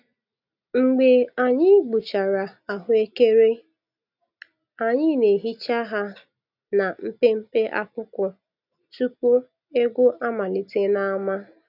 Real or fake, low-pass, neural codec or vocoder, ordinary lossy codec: real; 5.4 kHz; none; none